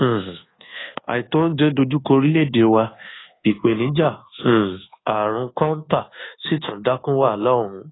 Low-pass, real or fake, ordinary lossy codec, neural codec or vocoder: 7.2 kHz; fake; AAC, 16 kbps; codec, 24 kHz, 1.2 kbps, DualCodec